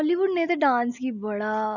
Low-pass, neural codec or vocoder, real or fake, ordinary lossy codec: 7.2 kHz; none; real; none